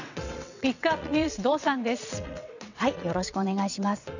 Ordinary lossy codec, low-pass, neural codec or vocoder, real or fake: none; 7.2 kHz; vocoder, 22.05 kHz, 80 mel bands, WaveNeXt; fake